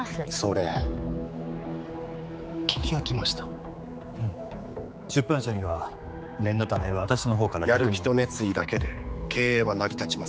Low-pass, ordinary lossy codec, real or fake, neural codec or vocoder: none; none; fake; codec, 16 kHz, 4 kbps, X-Codec, HuBERT features, trained on general audio